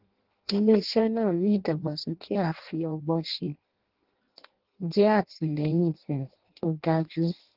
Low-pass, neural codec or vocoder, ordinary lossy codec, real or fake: 5.4 kHz; codec, 16 kHz in and 24 kHz out, 0.6 kbps, FireRedTTS-2 codec; Opus, 16 kbps; fake